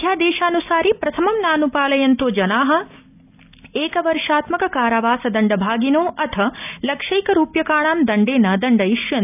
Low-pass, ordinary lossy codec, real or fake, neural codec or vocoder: 3.6 kHz; none; real; none